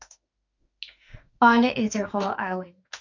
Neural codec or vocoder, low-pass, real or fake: autoencoder, 48 kHz, 32 numbers a frame, DAC-VAE, trained on Japanese speech; 7.2 kHz; fake